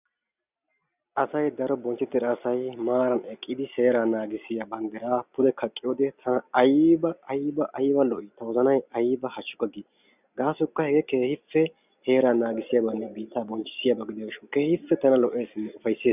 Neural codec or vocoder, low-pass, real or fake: none; 3.6 kHz; real